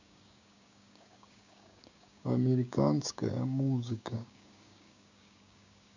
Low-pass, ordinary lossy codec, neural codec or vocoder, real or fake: 7.2 kHz; AAC, 48 kbps; codec, 16 kHz, 6 kbps, DAC; fake